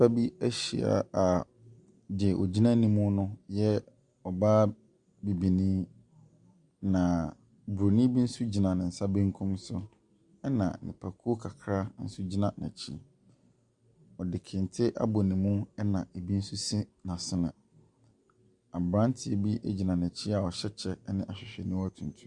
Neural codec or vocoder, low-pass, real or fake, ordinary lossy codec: none; 10.8 kHz; real; Opus, 64 kbps